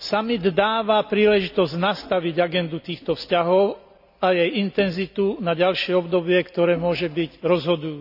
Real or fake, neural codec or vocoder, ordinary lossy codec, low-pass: real; none; none; 5.4 kHz